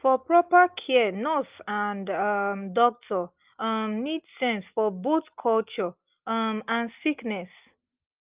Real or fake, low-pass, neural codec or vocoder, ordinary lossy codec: real; 3.6 kHz; none; Opus, 24 kbps